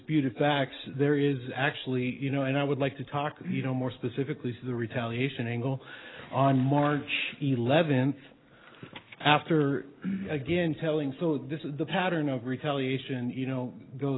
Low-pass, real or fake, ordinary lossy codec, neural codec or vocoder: 7.2 kHz; real; AAC, 16 kbps; none